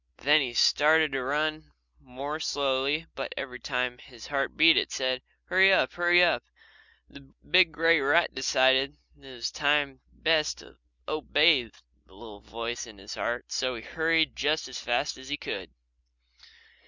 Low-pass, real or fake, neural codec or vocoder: 7.2 kHz; real; none